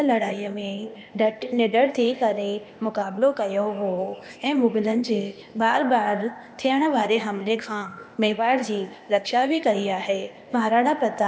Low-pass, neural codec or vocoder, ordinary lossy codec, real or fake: none; codec, 16 kHz, 0.8 kbps, ZipCodec; none; fake